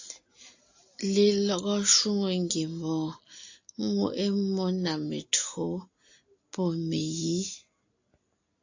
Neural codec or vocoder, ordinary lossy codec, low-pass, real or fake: none; AAC, 48 kbps; 7.2 kHz; real